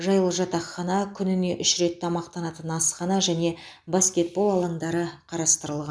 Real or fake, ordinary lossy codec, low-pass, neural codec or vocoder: real; none; none; none